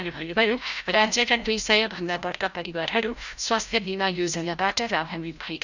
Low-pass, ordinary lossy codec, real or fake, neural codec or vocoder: 7.2 kHz; none; fake; codec, 16 kHz, 0.5 kbps, FreqCodec, larger model